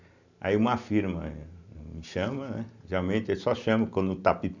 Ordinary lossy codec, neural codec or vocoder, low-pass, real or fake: none; none; 7.2 kHz; real